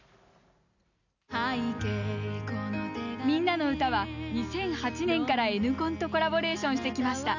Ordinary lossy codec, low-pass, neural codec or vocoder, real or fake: none; 7.2 kHz; none; real